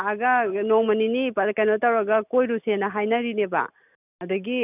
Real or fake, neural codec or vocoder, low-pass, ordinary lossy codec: real; none; 3.6 kHz; none